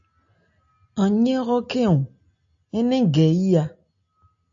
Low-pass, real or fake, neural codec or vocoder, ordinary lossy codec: 7.2 kHz; real; none; AAC, 64 kbps